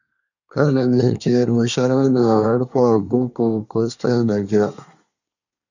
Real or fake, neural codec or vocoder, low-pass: fake; codec, 24 kHz, 1 kbps, SNAC; 7.2 kHz